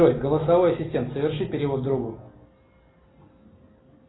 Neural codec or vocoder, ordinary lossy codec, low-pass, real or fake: none; AAC, 16 kbps; 7.2 kHz; real